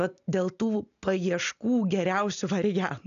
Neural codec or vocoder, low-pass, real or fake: none; 7.2 kHz; real